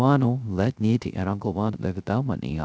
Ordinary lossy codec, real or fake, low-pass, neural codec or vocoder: none; fake; none; codec, 16 kHz, 0.3 kbps, FocalCodec